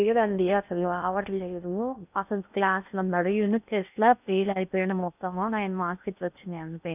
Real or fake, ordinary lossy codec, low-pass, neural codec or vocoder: fake; none; 3.6 kHz; codec, 16 kHz in and 24 kHz out, 0.6 kbps, FocalCodec, streaming, 2048 codes